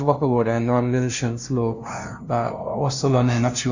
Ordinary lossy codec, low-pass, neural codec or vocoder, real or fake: Opus, 64 kbps; 7.2 kHz; codec, 16 kHz, 0.5 kbps, FunCodec, trained on LibriTTS, 25 frames a second; fake